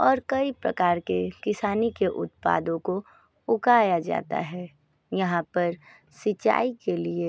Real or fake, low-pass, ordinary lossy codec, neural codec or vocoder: real; none; none; none